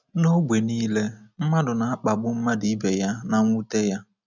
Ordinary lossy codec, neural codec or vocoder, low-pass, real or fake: none; none; 7.2 kHz; real